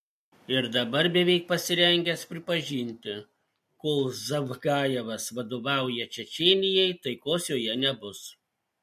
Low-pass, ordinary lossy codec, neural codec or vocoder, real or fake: 14.4 kHz; MP3, 64 kbps; none; real